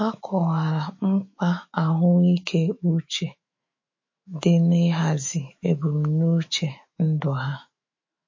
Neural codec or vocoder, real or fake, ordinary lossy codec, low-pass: autoencoder, 48 kHz, 128 numbers a frame, DAC-VAE, trained on Japanese speech; fake; MP3, 32 kbps; 7.2 kHz